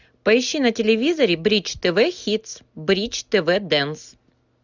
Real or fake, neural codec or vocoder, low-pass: real; none; 7.2 kHz